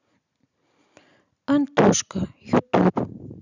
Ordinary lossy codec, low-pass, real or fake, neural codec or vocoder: none; 7.2 kHz; real; none